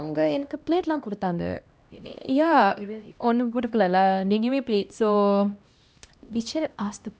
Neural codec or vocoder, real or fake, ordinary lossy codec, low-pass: codec, 16 kHz, 1 kbps, X-Codec, HuBERT features, trained on LibriSpeech; fake; none; none